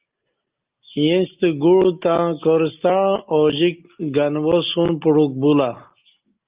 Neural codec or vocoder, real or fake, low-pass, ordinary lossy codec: none; real; 3.6 kHz; Opus, 32 kbps